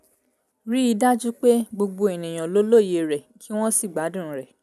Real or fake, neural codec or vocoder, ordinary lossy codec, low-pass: real; none; none; 14.4 kHz